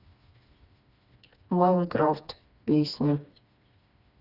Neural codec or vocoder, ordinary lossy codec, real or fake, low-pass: codec, 16 kHz, 2 kbps, FreqCodec, smaller model; Opus, 64 kbps; fake; 5.4 kHz